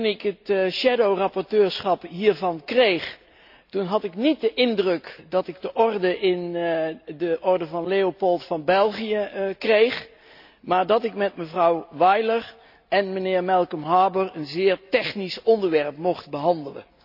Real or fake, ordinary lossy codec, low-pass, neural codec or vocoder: real; AAC, 48 kbps; 5.4 kHz; none